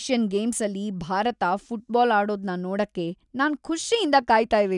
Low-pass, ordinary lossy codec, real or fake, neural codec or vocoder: 10.8 kHz; none; real; none